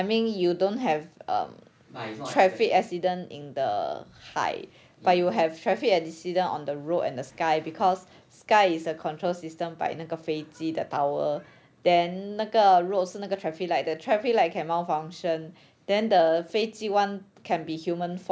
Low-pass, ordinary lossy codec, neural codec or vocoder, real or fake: none; none; none; real